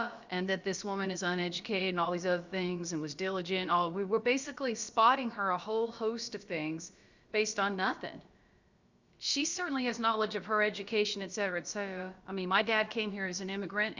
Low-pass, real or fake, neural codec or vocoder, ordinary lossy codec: 7.2 kHz; fake; codec, 16 kHz, about 1 kbps, DyCAST, with the encoder's durations; Opus, 64 kbps